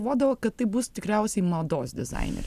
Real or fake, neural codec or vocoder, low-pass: real; none; 14.4 kHz